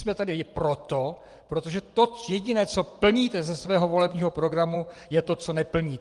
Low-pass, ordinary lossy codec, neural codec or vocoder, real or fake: 10.8 kHz; Opus, 24 kbps; vocoder, 24 kHz, 100 mel bands, Vocos; fake